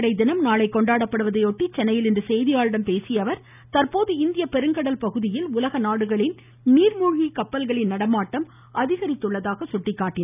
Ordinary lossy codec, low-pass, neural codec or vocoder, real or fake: none; 3.6 kHz; none; real